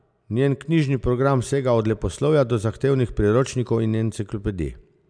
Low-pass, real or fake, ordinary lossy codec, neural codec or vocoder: 9.9 kHz; real; none; none